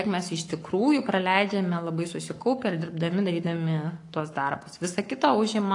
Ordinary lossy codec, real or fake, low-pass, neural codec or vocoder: AAC, 48 kbps; fake; 10.8 kHz; codec, 44.1 kHz, 7.8 kbps, Pupu-Codec